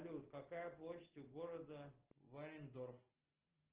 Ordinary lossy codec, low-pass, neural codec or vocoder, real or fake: Opus, 16 kbps; 3.6 kHz; none; real